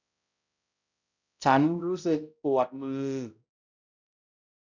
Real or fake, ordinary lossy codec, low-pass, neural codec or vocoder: fake; none; 7.2 kHz; codec, 16 kHz, 0.5 kbps, X-Codec, HuBERT features, trained on balanced general audio